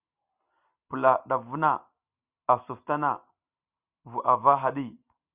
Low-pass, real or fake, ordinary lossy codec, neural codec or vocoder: 3.6 kHz; real; Opus, 64 kbps; none